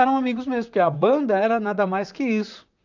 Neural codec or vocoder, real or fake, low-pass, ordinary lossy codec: vocoder, 44.1 kHz, 128 mel bands, Pupu-Vocoder; fake; 7.2 kHz; none